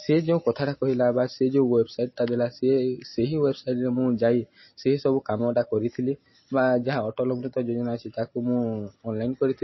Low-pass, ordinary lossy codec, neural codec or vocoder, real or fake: 7.2 kHz; MP3, 24 kbps; none; real